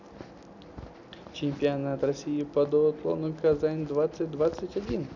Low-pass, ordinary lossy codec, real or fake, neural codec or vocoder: 7.2 kHz; none; real; none